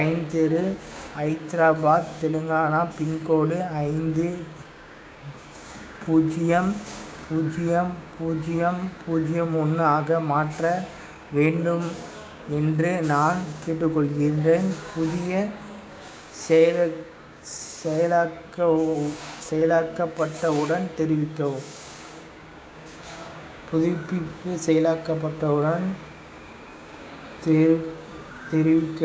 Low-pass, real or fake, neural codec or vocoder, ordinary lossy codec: none; fake; codec, 16 kHz, 6 kbps, DAC; none